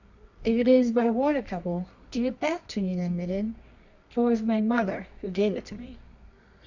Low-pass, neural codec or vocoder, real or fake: 7.2 kHz; codec, 24 kHz, 0.9 kbps, WavTokenizer, medium music audio release; fake